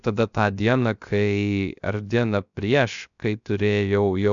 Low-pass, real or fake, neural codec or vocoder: 7.2 kHz; fake; codec, 16 kHz, 0.3 kbps, FocalCodec